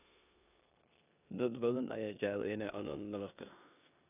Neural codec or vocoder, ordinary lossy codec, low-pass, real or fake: codec, 16 kHz in and 24 kHz out, 0.9 kbps, LongCat-Audio-Codec, four codebook decoder; none; 3.6 kHz; fake